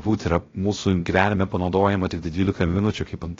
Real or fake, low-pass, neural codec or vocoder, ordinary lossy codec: fake; 7.2 kHz; codec, 16 kHz, 0.3 kbps, FocalCodec; AAC, 32 kbps